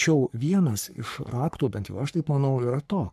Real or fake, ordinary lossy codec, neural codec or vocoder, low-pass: fake; MP3, 96 kbps; codec, 44.1 kHz, 3.4 kbps, Pupu-Codec; 14.4 kHz